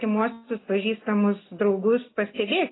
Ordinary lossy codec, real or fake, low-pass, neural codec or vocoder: AAC, 16 kbps; real; 7.2 kHz; none